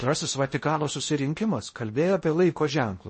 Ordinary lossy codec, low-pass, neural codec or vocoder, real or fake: MP3, 32 kbps; 10.8 kHz; codec, 16 kHz in and 24 kHz out, 0.6 kbps, FocalCodec, streaming, 4096 codes; fake